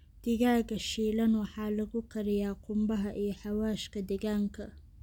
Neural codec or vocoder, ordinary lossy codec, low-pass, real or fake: none; none; 19.8 kHz; real